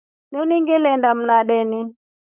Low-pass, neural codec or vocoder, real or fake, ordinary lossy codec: 3.6 kHz; codec, 16 kHz, 4.8 kbps, FACodec; fake; Opus, 64 kbps